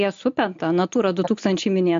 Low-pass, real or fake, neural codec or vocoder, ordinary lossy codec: 7.2 kHz; real; none; MP3, 48 kbps